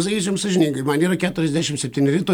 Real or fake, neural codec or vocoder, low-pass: real; none; 19.8 kHz